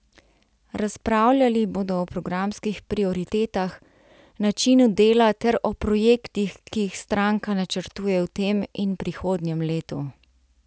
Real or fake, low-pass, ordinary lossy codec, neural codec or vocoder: real; none; none; none